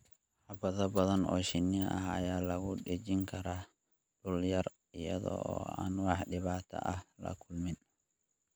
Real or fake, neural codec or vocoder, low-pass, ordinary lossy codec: real; none; none; none